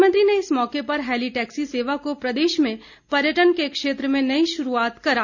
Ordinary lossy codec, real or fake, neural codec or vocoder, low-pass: none; real; none; 7.2 kHz